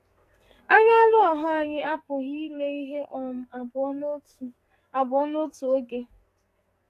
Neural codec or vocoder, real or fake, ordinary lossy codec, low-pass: codec, 44.1 kHz, 2.6 kbps, SNAC; fake; AAC, 64 kbps; 14.4 kHz